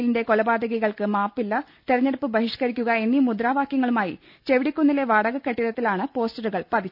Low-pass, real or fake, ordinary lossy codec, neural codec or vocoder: 5.4 kHz; real; none; none